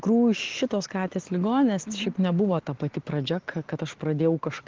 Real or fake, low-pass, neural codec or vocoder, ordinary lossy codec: real; 7.2 kHz; none; Opus, 16 kbps